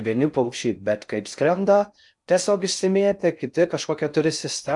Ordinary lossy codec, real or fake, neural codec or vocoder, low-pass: MP3, 96 kbps; fake; codec, 16 kHz in and 24 kHz out, 0.6 kbps, FocalCodec, streaming, 4096 codes; 10.8 kHz